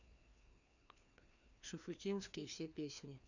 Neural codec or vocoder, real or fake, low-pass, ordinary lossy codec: codec, 16 kHz, 2 kbps, FreqCodec, larger model; fake; 7.2 kHz; none